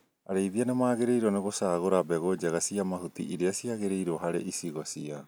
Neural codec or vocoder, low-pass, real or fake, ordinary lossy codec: none; none; real; none